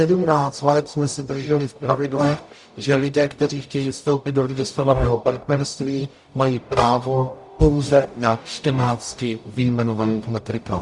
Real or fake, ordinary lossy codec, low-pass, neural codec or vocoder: fake; Opus, 64 kbps; 10.8 kHz; codec, 44.1 kHz, 0.9 kbps, DAC